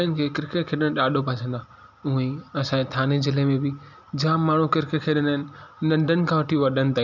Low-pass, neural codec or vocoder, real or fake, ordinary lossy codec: 7.2 kHz; none; real; none